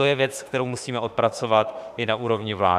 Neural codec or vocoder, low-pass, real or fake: autoencoder, 48 kHz, 32 numbers a frame, DAC-VAE, trained on Japanese speech; 14.4 kHz; fake